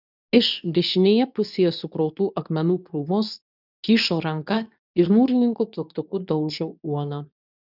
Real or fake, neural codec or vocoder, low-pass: fake; codec, 24 kHz, 0.9 kbps, WavTokenizer, medium speech release version 2; 5.4 kHz